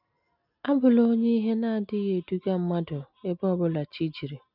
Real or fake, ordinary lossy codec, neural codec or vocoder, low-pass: real; none; none; 5.4 kHz